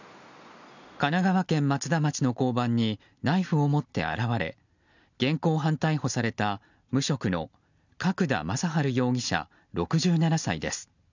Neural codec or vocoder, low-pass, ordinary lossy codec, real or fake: none; 7.2 kHz; none; real